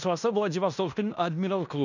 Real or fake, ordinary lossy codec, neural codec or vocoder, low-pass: fake; none; codec, 16 kHz in and 24 kHz out, 0.9 kbps, LongCat-Audio-Codec, fine tuned four codebook decoder; 7.2 kHz